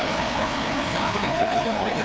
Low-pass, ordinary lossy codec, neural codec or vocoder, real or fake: none; none; codec, 16 kHz, 2 kbps, FreqCodec, larger model; fake